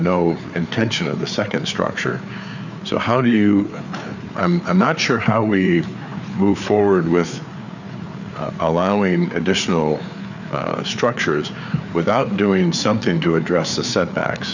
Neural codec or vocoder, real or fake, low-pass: codec, 16 kHz, 4 kbps, FreqCodec, larger model; fake; 7.2 kHz